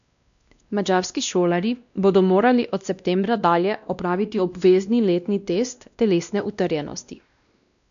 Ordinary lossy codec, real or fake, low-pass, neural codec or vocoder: none; fake; 7.2 kHz; codec, 16 kHz, 1 kbps, X-Codec, WavLM features, trained on Multilingual LibriSpeech